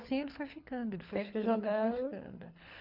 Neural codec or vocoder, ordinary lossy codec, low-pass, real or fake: codec, 24 kHz, 6 kbps, HILCodec; MP3, 48 kbps; 5.4 kHz; fake